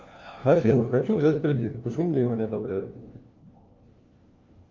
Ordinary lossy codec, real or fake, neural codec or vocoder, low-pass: Opus, 32 kbps; fake; codec, 16 kHz, 1 kbps, FunCodec, trained on LibriTTS, 50 frames a second; 7.2 kHz